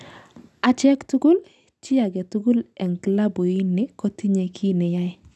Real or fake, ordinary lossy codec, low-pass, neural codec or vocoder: real; none; none; none